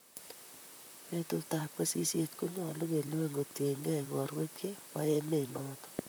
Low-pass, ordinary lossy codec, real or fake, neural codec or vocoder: none; none; fake; vocoder, 44.1 kHz, 128 mel bands, Pupu-Vocoder